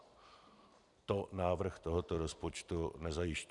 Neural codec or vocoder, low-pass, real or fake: vocoder, 44.1 kHz, 128 mel bands every 256 samples, BigVGAN v2; 10.8 kHz; fake